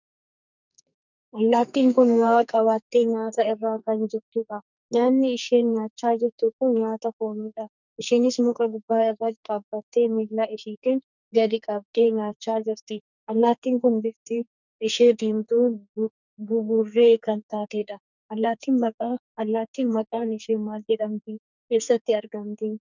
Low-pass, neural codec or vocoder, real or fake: 7.2 kHz; codec, 44.1 kHz, 2.6 kbps, SNAC; fake